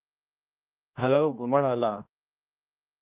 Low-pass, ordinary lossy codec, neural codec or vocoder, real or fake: 3.6 kHz; Opus, 24 kbps; codec, 16 kHz, 1 kbps, X-Codec, HuBERT features, trained on general audio; fake